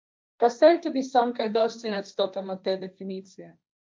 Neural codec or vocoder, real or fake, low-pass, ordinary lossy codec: codec, 16 kHz, 1.1 kbps, Voila-Tokenizer; fake; none; none